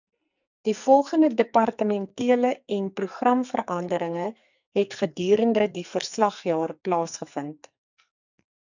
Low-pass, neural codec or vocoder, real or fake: 7.2 kHz; codec, 44.1 kHz, 2.6 kbps, SNAC; fake